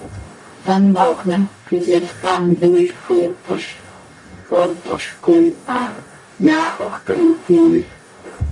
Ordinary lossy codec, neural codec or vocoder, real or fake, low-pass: AAC, 64 kbps; codec, 44.1 kHz, 0.9 kbps, DAC; fake; 10.8 kHz